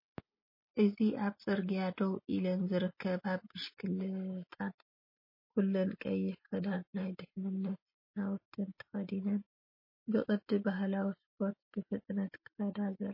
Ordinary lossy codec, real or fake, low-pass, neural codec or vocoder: MP3, 24 kbps; real; 5.4 kHz; none